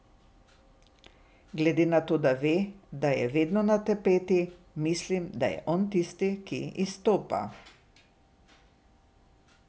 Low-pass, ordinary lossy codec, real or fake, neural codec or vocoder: none; none; real; none